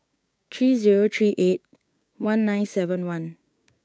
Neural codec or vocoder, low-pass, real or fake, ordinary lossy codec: codec, 16 kHz, 6 kbps, DAC; none; fake; none